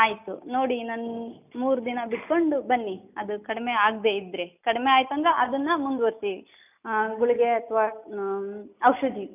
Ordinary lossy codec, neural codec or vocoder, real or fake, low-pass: none; none; real; 3.6 kHz